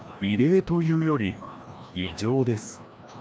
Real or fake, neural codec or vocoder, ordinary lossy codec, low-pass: fake; codec, 16 kHz, 1 kbps, FreqCodec, larger model; none; none